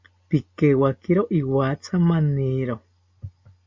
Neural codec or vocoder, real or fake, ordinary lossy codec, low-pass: none; real; MP3, 48 kbps; 7.2 kHz